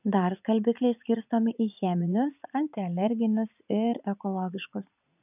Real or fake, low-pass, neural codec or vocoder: fake; 3.6 kHz; vocoder, 44.1 kHz, 80 mel bands, Vocos